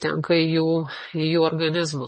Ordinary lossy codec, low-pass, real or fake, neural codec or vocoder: MP3, 32 kbps; 10.8 kHz; real; none